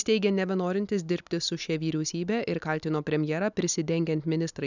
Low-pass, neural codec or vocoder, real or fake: 7.2 kHz; none; real